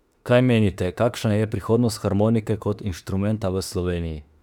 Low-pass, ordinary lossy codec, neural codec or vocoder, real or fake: 19.8 kHz; none; autoencoder, 48 kHz, 32 numbers a frame, DAC-VAE, trained on Japanese speech; fake